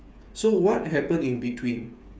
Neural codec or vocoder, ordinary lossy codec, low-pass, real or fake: codec, 16 kHz, 8 kbps, FreqCodec, smaller model; none; none; fake